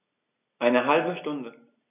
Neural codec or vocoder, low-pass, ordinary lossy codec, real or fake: none; 3.6 kHz; none; real